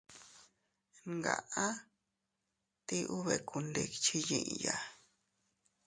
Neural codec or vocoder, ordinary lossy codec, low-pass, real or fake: none; MP3, 48 kbps; 9.9 kHz; real